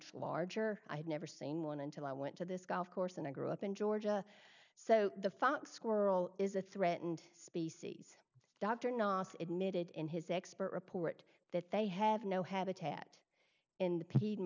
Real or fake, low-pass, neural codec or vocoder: real; 7.2 kHz; none